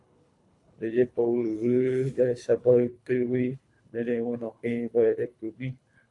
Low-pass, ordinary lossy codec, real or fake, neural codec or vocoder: 10.8 kHz; AAC, 48 kbps; fake; codec, 24 kHz, 3 kbps, HILCodec